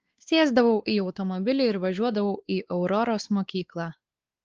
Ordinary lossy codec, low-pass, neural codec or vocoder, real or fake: Opus, 16 kbps; 7.2 kHz; codec, 16 kHz, 4 kbps, X-Codec, WavLM features, trained on Multilingual LibriSpeech; fake